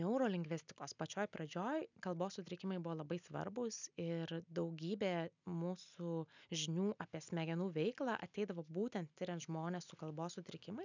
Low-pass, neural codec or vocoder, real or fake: 7.2 kHz; none; real